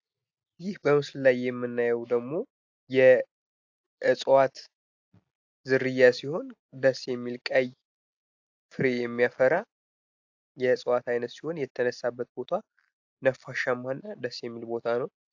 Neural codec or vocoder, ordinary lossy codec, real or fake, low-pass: none; AAC, 48 kbps; real; 7.2 kHz